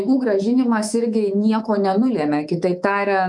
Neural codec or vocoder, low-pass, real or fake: codec, 24 kHz, 3.1 kbps, DualCodec; 10.8 kHz; fake